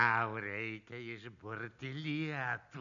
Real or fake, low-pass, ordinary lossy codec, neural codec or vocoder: real; 7.2 kHz; MP3, 96 kbps; none